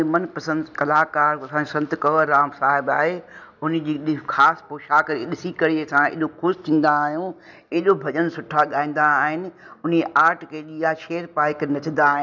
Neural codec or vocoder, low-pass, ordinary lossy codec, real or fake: none; 7.2 kHz; none; real